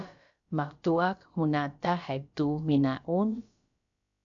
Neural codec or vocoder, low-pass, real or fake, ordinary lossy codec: codec, 16 kHz, about 1 kbps, DyCAST, with the encoder's durations; 7.2 kHz; fake; AAC, 64 kbps